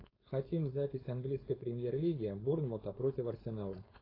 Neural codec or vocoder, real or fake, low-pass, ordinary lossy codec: codec, 16 kHz, 4.8 kbps, FACodec; fake; 5.4 kHz; AAC, 24 kbps